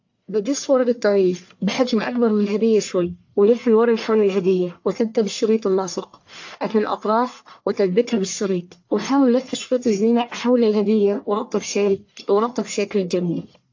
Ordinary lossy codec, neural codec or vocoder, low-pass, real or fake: AAC, 48 kbps; codec, 44.1 kHz, 1.7 kbps, Pupu-Codec; 7.2 kHz; fake